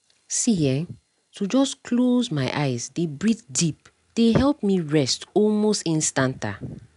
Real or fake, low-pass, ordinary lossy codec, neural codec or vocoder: real; 10.8 kHz; none; none